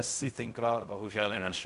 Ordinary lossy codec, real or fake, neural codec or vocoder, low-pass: MP3, 96 kbps; fake; codec, 16 kHz in and 24 kHz out, 0.4 kbps, LongCat-Audio-Codec, fine tuned four codebook decoder; 10.8 kHz